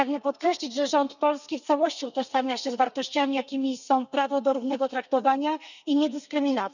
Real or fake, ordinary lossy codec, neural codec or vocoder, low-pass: fake; none; codec, 32 kHz, 1.9 kbps, SNAC; 7.2 kHz